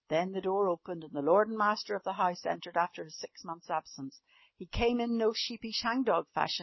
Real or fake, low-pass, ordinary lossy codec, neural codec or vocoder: real; 7.2 kHz; MP3, 24 kbps; none